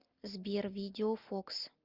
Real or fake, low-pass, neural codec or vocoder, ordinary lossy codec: real; 5.4 kHz; none; Opus, 24 kbps